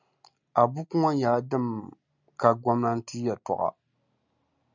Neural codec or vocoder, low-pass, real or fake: none; 7.2 kHz; real